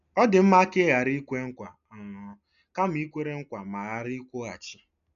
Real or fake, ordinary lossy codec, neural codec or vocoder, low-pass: real; none; none; 7.2 kHz